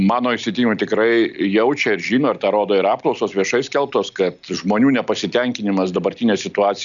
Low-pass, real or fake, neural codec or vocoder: 7.2 kHz; real; none